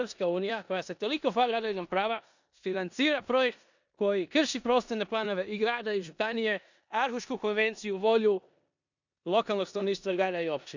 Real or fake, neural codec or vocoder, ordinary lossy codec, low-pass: fake; codec, 16 kHz in and 24 kHz out, 0.9 kbps, LongCat-Audio-Codec, four codebook decoder; none; 7.2 kHz